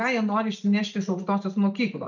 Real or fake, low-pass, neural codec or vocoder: real; 7.2 kHz; none